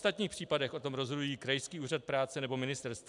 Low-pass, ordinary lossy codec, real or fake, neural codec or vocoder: 10.8 kHz; MP3, 96 kbps; real; none